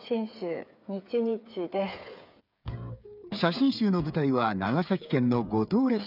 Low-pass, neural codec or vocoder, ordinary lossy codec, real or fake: 5.4 kHz; codec, 16 kHz, 8 kbps, FreqCodec, smaller model; none; fake